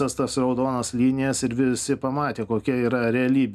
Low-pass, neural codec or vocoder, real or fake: 14.4 kHz; none; real